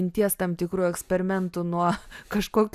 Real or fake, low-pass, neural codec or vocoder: real; 14.4 kHz; none